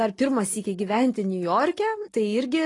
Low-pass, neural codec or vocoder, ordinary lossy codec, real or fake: 10.8 kHz; none; AAC, 32 kbps; real